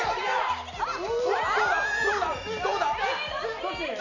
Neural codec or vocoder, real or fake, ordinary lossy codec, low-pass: none; real; none; 7.2 kHz